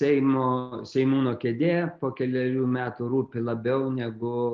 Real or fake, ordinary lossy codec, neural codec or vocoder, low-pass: real; Opus, 32 kbps; none; 7.2 kHz